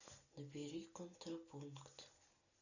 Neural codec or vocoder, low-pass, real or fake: none; 7.2 kHz; real